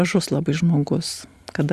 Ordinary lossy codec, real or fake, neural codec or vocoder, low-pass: Opus, 64 kbps; real; none; 14.4 kHz